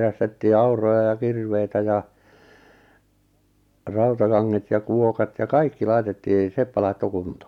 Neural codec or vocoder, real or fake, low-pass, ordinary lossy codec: none; real; 19.8 kHz; MP3, 96 kbps